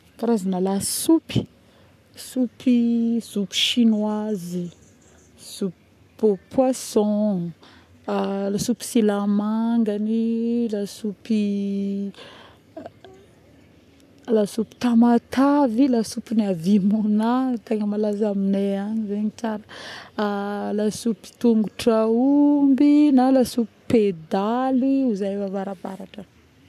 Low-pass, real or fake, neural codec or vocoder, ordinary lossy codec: 14.4 kHz; fake; codec, 44.1 kHz, 7.8 kbps, Pupu-Codec; none